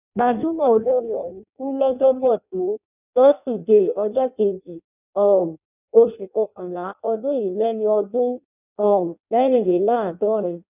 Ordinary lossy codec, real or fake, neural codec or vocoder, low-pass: none; fake; codec, 16 kHz in and 24 kHz out, 0.6 kbps, FireRedTTS-2 codec; 3.6 kHz